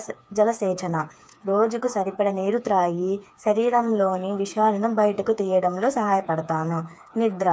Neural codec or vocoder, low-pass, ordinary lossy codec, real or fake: codec, 16 kHz, 4 kbps, FreqCodec, smaller model; none; none; fake